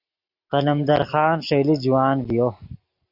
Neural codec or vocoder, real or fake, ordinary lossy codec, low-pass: none; real; Opus, 64 kbps; 5.4 kHz